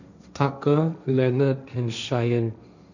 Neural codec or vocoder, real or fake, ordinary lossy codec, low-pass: codec, 16 kHz, 1.1 kbps, Voila-Tokenizer; fake; none; 7.2 kHz